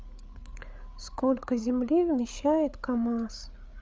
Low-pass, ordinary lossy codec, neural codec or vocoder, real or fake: none; none; codec, 16 kHz, 8 kbps, FreqCodec, larger model; fake